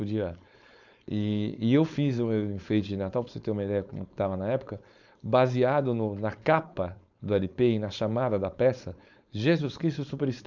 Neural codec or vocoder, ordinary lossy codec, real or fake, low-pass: codec, 16 kHz, 4.8 kbps, FACodec; none; fake; 7.2 kHz